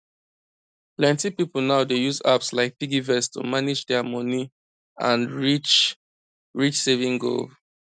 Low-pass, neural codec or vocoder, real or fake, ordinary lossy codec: 9.9 kHz; none; real; none